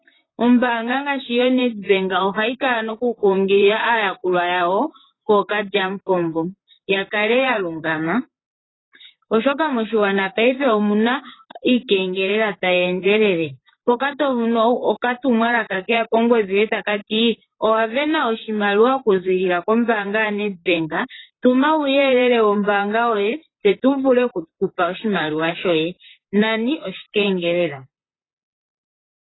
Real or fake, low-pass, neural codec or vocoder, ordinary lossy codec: fake; 7.2 kHz; vocoder, 44.1 kHz, 128 mel bands, Pupu-Vocoder; AAC, 16 kbps